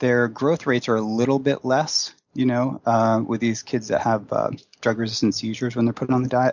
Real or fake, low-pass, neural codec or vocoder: real; 7.2 kHz; none